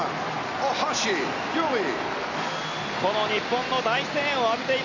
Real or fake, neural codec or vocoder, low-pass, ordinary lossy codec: real; none; 7.2 kHz; none